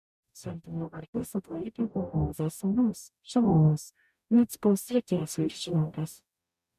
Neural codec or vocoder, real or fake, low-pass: codec, 44.1 kHz, 0.9 kbps, DAC; fake; 14.4 kHz